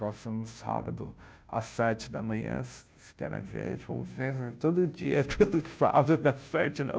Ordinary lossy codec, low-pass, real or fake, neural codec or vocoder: none; none; fake; codec, 16 kHz, 0.5 kbps, FunCodec, trained on Chinese and English, 25 frames a second